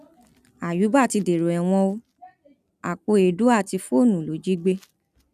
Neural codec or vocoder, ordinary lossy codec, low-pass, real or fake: none; none; 14.4 kHz; real